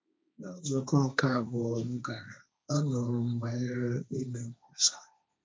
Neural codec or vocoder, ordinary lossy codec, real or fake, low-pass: codec, 16 kHz, 1.1 kbps, Voila-Tokenizer; none; fake; none